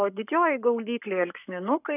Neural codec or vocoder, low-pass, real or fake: codec, 44.1 kHz, 7.8 kbps, Pupu-Codec; 3.6 kHz; fake